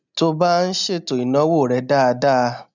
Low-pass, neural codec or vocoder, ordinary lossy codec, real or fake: 7.2 kHz; none; none; real